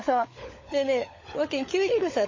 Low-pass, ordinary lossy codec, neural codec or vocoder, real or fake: 7.2 kHz; MP3, 32 kbps; codec, 16 kHz, 16 kbps, FunCodec, trained on LibriTTS, 50 frames a second; fake